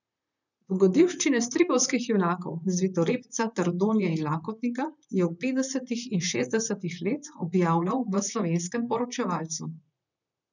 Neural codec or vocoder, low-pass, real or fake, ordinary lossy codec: vocoder, 44.1 kHz, 128 mel bands, Pupu-Vocoder; 7.2 kHz; fake; none